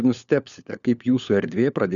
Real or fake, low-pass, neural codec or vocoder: fake; 7.2 kHz; codec, 16 kHz, 4 kbps, FunCodec, trained on LibriTTS, 50 frames a second